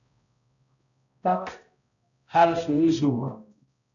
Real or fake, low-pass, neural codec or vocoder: fake; 7.2 kHz; codec, 16 kHz, 0.5 kbps, X-Codec, HuBERT features, trained on balanced general audio